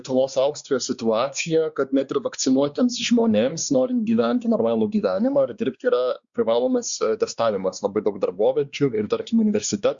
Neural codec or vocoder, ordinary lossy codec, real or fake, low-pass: codec, 16 kHz, 2 kbps, X-Codec, WavLM features, trained on Multilingual LibriSpeech; Opus, 64 kbps; fake; 7.2 kHz